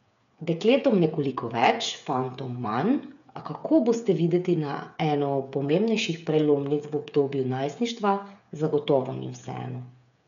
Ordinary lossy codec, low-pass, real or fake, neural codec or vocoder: none; 7.2 kHz; fake; codec, 16 kHz, 8 kbps, FreqCodec, smaller model